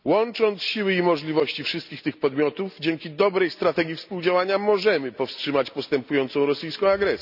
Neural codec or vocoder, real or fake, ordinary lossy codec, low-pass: none; real; none; 5.4 kHz